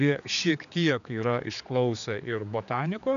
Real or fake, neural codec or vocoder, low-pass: fake; codec, 16 kHz, 4 kbps, X-Codec, HuBERT features, trained on general audio; 7.2 kHz